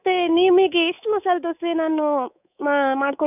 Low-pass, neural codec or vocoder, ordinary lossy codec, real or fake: 3.6 kHz; codec, 24 kHz, 3.1 kbps, DualCodec; none; fake